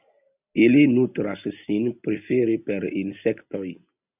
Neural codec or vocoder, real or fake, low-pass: none; real; 3.6 kHz